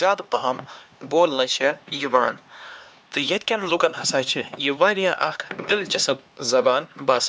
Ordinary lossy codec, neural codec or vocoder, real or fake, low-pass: none; codec, 16 kHz, 2 kbps, X-Codec, HuBERT features, trained on LibriSpeech; fake; none